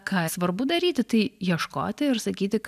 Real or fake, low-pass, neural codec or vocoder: real; 14.4 kHz; none